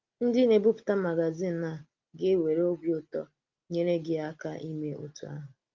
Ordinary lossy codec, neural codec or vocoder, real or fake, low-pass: Opus, 32 kbps; none; real; 7.2 kHz